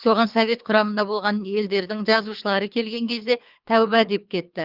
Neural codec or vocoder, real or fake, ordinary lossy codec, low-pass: codec, 24 kHz, 3 kbps, HILCodec; fake; Opus, 24 kbps; 5.4 kHz